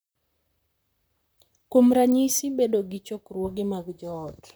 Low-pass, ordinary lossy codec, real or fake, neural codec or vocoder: none; none; fake; vocoder, 44.1 kHz, 128 mel bands, Pupu-Vocoder